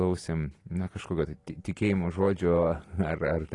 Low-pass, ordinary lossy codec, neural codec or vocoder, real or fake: 10.8 kHz; AAC, 32 kbps; none; real